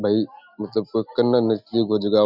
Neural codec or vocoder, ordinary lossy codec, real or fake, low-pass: none; none; real; 5.4 kHz